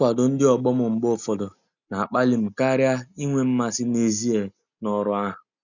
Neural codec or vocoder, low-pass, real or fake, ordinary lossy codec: none; 7.2 kHz; real; none